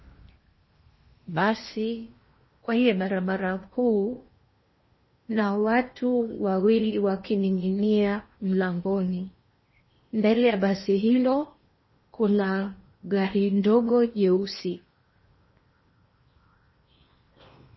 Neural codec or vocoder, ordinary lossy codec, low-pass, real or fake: codec, 16 kHz in and 24 kHz out, 0.8 kbps, FocalCodec, streaming, 65536 codes; MP3, 24 kbps; 7.2 kHz; fake